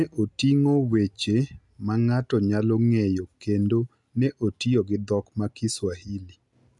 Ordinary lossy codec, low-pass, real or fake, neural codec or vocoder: none; 10.8 kHz; real; none